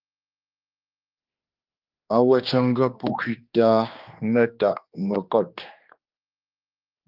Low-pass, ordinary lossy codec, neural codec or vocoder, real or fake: 5.4 kHz; Opus, 32 kbps; codec, 16 kHz, 2 kbps, X-Codec, HuBERT features, trained on general audio; fake